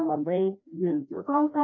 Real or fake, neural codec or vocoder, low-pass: fake; codec, 16 kHz, 1 kbps, FreqCodec, larger model; 7.2 kHz